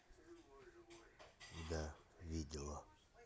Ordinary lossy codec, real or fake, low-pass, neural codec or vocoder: none; real; none; none